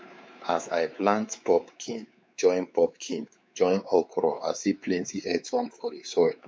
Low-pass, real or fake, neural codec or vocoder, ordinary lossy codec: none; fake; codec, 16 kHz, 4 kbps, X-Codec, WavLM features, trained on Multilingual LibriSpeech; none